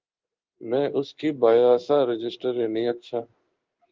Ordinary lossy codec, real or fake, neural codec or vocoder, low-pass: Opus, 24 kbps; fake; codec, 16 kHz in and 24 kHz out, 1 kbps, XY-Tokenizer; 7.2 kHz